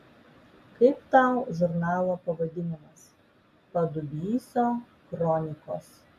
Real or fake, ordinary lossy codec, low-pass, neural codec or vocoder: real; MP3, 64 kbps; 14.4 kHz; none